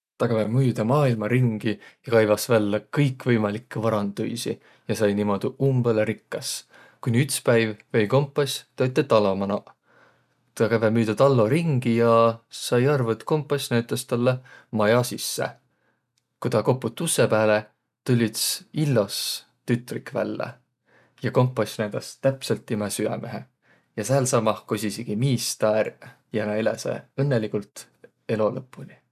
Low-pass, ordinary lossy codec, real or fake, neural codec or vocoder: 14.4 kHz; none; real; none